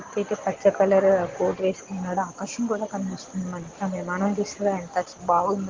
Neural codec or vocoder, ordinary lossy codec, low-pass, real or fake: none; Opus, 16 kbps; 7.2 kHz; real